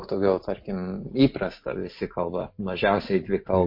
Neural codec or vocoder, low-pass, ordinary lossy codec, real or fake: none; 5.4 kHz; MP3, 32 kbps; real